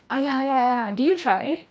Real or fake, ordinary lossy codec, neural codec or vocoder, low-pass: fake; none; codec, 16 kHz, 1 kbps, FreqCodec, larger model; none